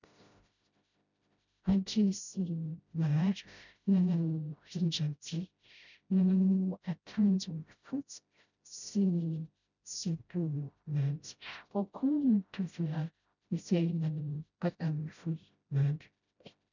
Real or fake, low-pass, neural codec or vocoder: fake; 7.2 kHz; codec, 16 kHz, 0.5 kbps, FreqCodec, smaller model